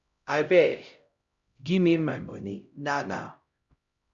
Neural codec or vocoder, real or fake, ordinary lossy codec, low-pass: codec, 16 kHz, 0.5 kbps, X-Codec, HuBERT features, trained on LibriSpeech; fake; Opus, 64 kbps; 7.2 kHz